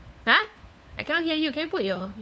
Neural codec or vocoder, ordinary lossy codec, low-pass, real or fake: codec, 16 kHz, 4 kbps, FunCodec, trained on LibriTTS, 50 frames a second; none; none; fake